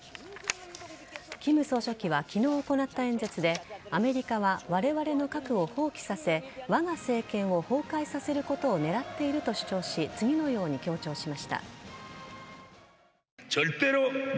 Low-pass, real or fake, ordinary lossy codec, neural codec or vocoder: none; real; none; none